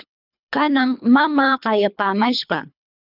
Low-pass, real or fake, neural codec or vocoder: 5.4 kHz; fake; codec, 24 kHz, 3 kbps, HILCodec